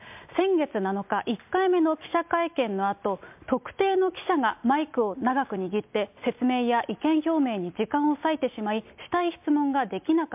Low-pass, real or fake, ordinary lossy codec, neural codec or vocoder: 3.6 kHz; real; MP3, 32 kbps; none